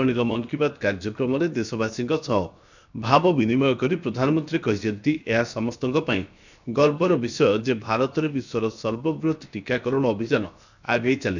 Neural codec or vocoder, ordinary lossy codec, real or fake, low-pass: codec, 16 kHz, 0.7 kbps, FocalCodec; none; fake; 7.2 kHz